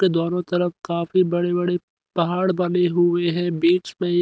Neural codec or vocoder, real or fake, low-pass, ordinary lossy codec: none; real; none; none